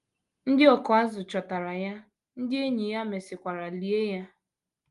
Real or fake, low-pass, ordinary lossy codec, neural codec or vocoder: real; 10.8 kHz; Opus, 32 kbps; none